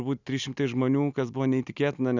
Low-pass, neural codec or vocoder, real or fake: 7.2 kHz; none; real